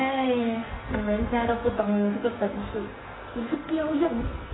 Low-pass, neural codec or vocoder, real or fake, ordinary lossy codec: 7.2 kHz; codec, 44.1 kHz, 2.6 kbps, SNAC; fake; AAC, 16 kbps